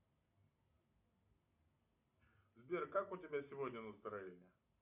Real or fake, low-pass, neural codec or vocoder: real; 3.6 kHz; none